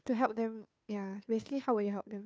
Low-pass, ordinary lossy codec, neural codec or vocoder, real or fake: none; none; codec, 16 kHz, 2 kbps, FunCodec, trained on Chinese and English, 25 frames a second; fake